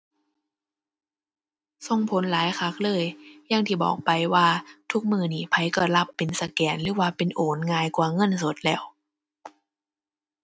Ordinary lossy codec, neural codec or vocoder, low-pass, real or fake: none; none; none; real